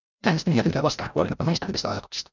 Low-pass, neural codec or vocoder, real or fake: 7.2 kHz; codec, 16 kHz, 0.5 kbps, FreqCodec, larger model; fake